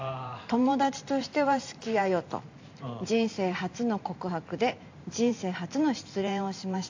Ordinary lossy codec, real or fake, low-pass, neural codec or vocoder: AAC, 48 kbps; fake; 7.2 kHz; vocoder, 44.1 kHz, 128 mel bands every 512 samples, BigVGAN v2